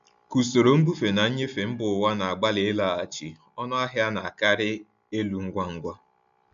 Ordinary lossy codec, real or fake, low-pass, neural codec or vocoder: MP3, 64 kbps; real; 7.2 kHz; none